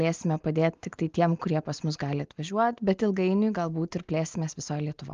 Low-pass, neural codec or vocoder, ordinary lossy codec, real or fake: 7.2 kHz; none; Opus, 32 kbps; real